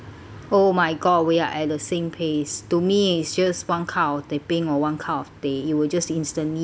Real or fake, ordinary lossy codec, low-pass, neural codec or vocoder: real; none; none; none